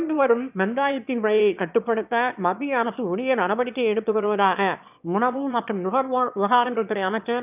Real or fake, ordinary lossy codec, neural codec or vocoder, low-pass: fake; none; autoencoder, 22.05 kHz, a latent of 192 numbers a frame, VITS, trained on one speaker; 3.6 kHz